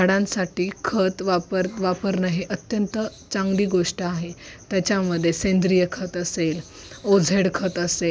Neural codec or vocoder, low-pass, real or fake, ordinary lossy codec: none; none; real; none